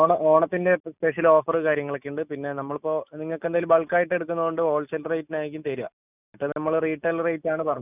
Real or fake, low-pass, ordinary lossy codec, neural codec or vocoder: real; 3.6 kHz; none; none